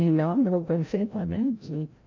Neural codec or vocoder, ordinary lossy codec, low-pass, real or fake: codec, 16 kHz, 0.5 kbps, FreqCodec, larger model; MP3, 48 kbps; 7.2 kHz; fake